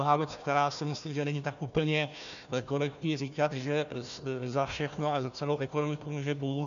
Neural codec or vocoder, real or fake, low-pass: codec, 16 kHz, 1 kbps, FunCodec, trained on Chinese and English, 50 frames a second; fake; 7.2 kHz